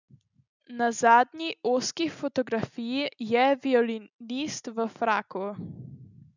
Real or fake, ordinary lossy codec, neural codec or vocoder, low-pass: real; none; none; 7.2 kHz